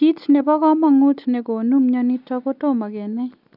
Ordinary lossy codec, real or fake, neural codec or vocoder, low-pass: none; real; none; 5.4 kHz